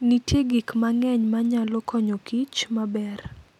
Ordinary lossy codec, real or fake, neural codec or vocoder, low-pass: none; real; none; 19.8 kHz